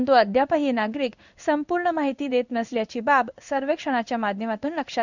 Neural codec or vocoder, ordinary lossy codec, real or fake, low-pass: codec, 16 kHz in and 24 kHz out, 1 kbps, XY-Tokenizer; none; fake; 7.2 kHz